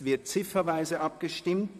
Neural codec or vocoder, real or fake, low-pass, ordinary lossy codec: vocoder, 44.1 kHz, 128 mel bands, Pupu-Vocoder; fake; 14.4 kHz; none